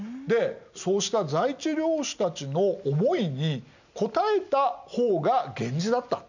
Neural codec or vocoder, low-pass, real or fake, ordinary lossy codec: none; 7.2 kHz; real; none